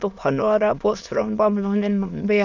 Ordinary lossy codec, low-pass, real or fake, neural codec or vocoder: none; 7.2 kHz; fake; autoencoder, 22.05 kHz, a latent of 192 numbers a frame, VITS, trained on many speakers